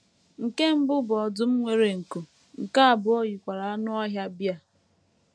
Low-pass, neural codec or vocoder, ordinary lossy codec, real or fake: none; none; none; real